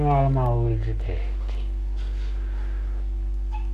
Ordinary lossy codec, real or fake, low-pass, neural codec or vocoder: none; fake; 14.4 kHz; codec, 44.1 kHz, 7.8 kbps, Pupu-Codec